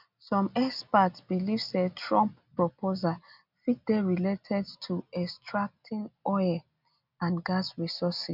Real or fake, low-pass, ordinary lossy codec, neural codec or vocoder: real; 5.4 kHz; none; none